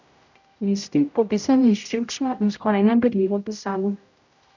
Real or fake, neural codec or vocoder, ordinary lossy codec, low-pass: fake; codec, 16 kHz, 0.5 kbps, X-Codec, HuBERT features, trained on general audio; none; 7.2 kHz